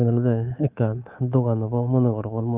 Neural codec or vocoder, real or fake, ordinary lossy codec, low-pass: codec, 24 kHz, 3.1 kbps, DualCodec; fake; Opus, 24 kbps; 3.6 kHz